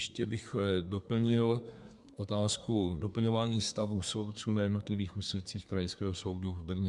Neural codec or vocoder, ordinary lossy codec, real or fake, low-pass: codec, 24 kHz, 1 kbps, SNAC; Opus, 64 kbps; fake; 10.8 kHz